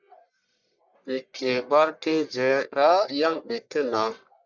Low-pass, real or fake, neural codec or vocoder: 7.2 kHz; fake; codec, 44.1 kHz, 1.7 kbps, Pupu-Codec